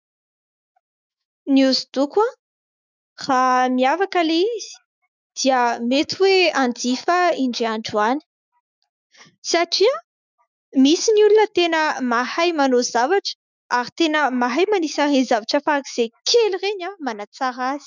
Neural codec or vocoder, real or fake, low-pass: autoencoder, 48 kHz, 128 numbers a frame, DAC-VAE, trained on Japanese speech; fake; 7.2 kHz